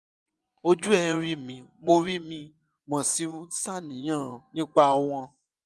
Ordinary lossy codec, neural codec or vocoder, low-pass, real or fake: none; vocoder, 24 kHz, 100 mel bands, Vocos; none; fake